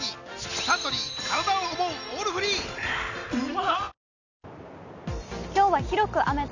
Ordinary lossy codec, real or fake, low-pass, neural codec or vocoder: none; real; 7.2 kHz; none